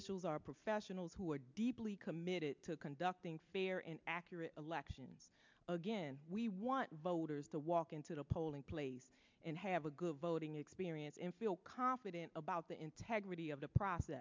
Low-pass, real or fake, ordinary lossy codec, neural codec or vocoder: 7.2 kHz; real; MP3, 64 kbps; none